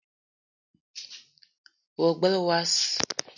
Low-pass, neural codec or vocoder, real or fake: 7.2 kHz; none; real